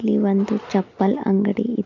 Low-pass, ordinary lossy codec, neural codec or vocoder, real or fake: 7.2 kHz; none; none; real